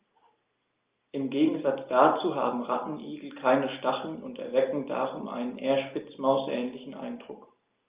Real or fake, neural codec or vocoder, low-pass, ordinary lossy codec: real; none; 3.6 kHz; Opus, 24 kbps